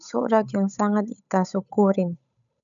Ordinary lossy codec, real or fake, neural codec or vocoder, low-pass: none; fake; codec, 16 kHz, 8 kbps, FunCodec, trained on Chinese and English, 25 frames a second; 7.2 kHz